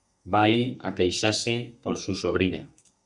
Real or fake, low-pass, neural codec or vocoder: fake; 10.8 kHz; codec, 32 kHz, 1.9 kbps, SNAC